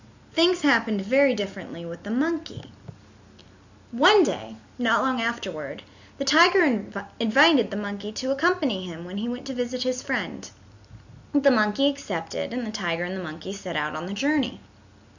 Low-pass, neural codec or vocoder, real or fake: 7.2 kHz; none; real